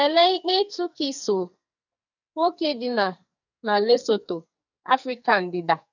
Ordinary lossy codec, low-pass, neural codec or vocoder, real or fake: none; 7.2 kHz; codec, 44.1 kHz, 2.6 kbps, SNAC; fake